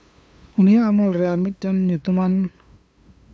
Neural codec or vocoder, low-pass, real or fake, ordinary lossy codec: codec, 16 kHz, 2 kbps, FunCodec, trained on LibriTTS, 25 frames a second; none; fake; none